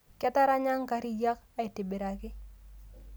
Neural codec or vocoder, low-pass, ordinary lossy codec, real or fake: none; none; none; real